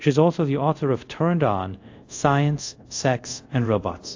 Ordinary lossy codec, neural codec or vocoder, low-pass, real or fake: AAC, 48 kbps; codec, 24 kHz, 0.5 kbps, DualCodec; 7.2 kHz; fake